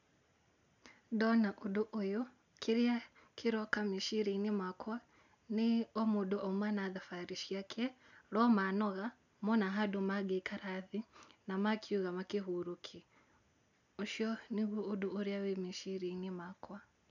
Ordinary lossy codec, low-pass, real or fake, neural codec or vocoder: none; 7.2 kHz; real; none